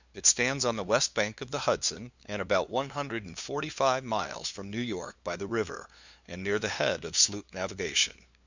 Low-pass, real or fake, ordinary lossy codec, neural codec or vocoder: 7.2 kHz; fake; Opus, 64 kbps; codec, 16 kHz, 2 kbps, FunCodec, trained on LibriTTS, 25 frames a second